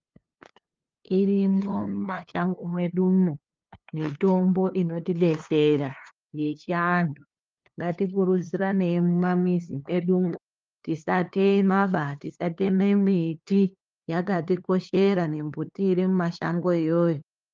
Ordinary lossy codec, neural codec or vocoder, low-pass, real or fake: Opus, 24 kbps; codec, 16 kHz, 2 kbps, FunCodec, trained on LibriTTS, 25 frames a second; 7.2 kHz; fake